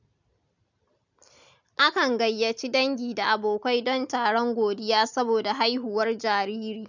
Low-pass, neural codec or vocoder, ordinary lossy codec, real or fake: 7.2 kHz; none; none; real